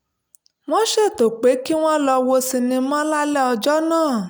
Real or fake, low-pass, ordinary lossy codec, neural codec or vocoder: real; none; none; none